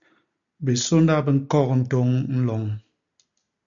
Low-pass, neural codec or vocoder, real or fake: 7.2 kHz; none; real